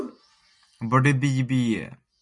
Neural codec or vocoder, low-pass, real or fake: none; 10.8 kHz; real